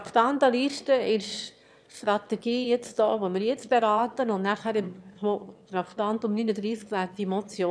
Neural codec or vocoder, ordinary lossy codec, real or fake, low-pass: autoencoder, 22.05 kHz, a latent of 192 numbers a frame, VITS, trained on one speaker; none; fake; none